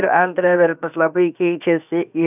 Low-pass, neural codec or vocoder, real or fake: 3.6 kHz; codec, 16 kHz, 0.7 kbps, FocalCodec; fake